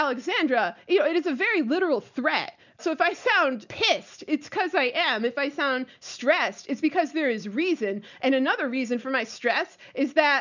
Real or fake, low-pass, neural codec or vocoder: real; 7.2 kHz; none